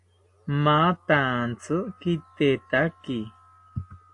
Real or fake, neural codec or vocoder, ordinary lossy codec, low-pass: real; none; AAC, 48 kbps; 10.8 kHz